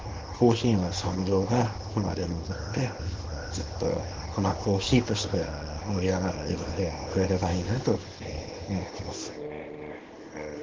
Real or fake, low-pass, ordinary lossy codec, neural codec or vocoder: fake; 7.2 kHz; Opus, 16 kbps; codec, 24 kHz, 0.9 kbps, WavTokenizer, small release